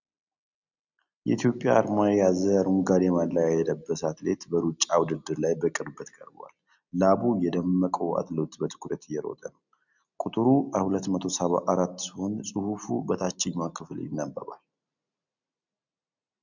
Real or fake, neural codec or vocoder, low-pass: real; none; 7.2 kHz